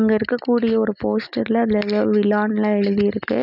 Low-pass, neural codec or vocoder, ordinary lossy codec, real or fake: 5.4 kHz; none; none; real